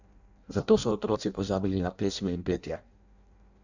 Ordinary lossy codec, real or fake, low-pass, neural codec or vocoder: none; fake; 7.2 kHz; codec, 16 kHz in and 24 kHz out, 0.6 kbps, FireRedTTS-2 codec